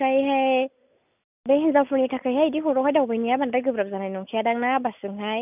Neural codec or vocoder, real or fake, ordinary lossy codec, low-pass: none; real; none; 3.6 kHz